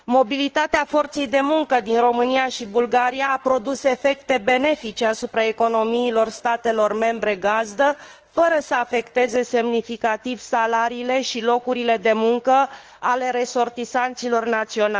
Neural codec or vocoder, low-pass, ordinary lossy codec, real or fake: autoencoder, 48 kHz, 32 numbers a frame, DAC-VAE, trained on Japanese speech; 7.2 kHz; Opus, 16 kbps; fake